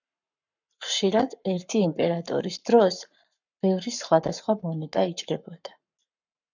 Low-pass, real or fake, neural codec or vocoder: 7.2 kHz; fake; codec, 44.1 kHz, 7.8 kbps, Pupu-Codec